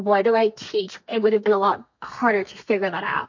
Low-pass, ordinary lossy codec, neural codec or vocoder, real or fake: 7.2 kHz; MP3, 48 kbps; codec, 32 kHz, 1.9 kbps, SNAC; fake